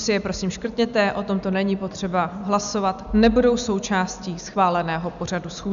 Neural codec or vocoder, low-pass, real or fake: none; 7.2 kHz; real